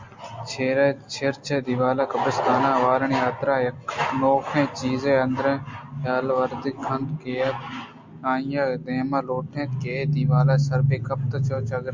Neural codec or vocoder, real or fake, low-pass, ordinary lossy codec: none; real; 7.2 kHz; MP3, 64 kbps